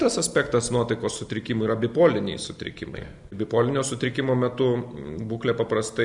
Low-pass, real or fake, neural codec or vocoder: 10.8 kHz; real; none